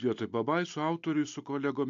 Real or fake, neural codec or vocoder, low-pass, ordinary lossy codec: real; none; 7.2 kHz; MP3, 64 kbps